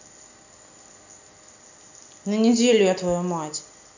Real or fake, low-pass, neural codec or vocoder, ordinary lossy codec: real; 7.2 kHz; none; none